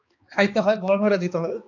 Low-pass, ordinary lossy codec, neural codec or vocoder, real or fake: 7.2 kHz; AAC, 48 kbps; codec, 16 kHz, 2 kbps, X-Codec, HuBERT features, trained on balanced general audio; fake